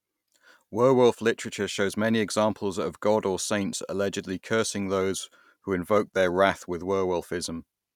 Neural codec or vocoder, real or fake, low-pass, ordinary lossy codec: none; real; 19.8 kHz; none